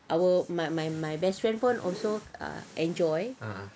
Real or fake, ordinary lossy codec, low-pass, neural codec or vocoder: real; none; none; none